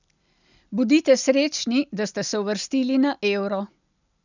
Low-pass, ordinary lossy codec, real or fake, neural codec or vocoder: 7.2 kHz; none; real; none